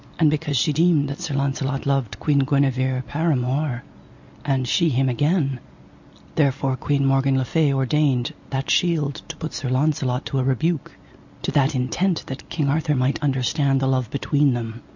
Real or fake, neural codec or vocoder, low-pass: real; none; 7.2 kHz